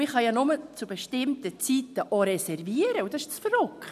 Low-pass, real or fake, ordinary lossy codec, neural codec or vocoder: 14.4 kHz; real; none; none